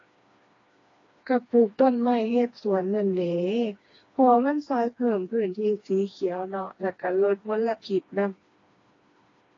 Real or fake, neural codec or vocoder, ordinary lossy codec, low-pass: fake; codec, 16 kHz, 2 kbps, FreqCodec, smaller model; AAC, 32 kbps; 7.2 kHz